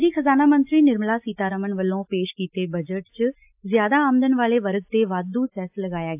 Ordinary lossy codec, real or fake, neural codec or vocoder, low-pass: AAC, 32 kbps; real; none; 3.6 kHz